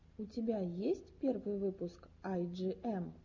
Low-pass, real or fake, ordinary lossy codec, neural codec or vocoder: 7.2 kHz; real; Opus, 64 kbps; none